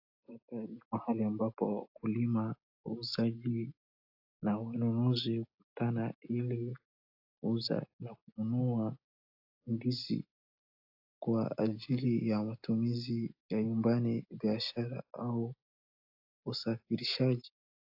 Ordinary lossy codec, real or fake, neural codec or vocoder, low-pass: AAC, 48 kbps; real; none; 5.4 kHz